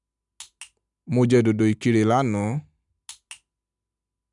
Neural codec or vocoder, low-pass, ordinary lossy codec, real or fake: none; 10.8 kHz; none; real